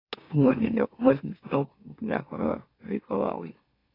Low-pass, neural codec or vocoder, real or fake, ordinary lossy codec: 5.4 kHz; autoencoder, 44.1 kHz, a latent of 192 numbers a frame, MeloTTS; fake; AAC, 24 kbps